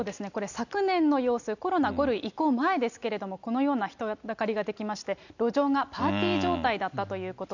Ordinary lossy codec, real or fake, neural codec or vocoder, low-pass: none; real; none; 7.2 kHz